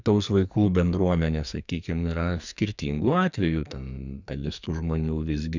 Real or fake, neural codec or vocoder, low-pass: fake; codec, 44.1 kHz, 2.6 kbps, SNAC; 7.2 kHz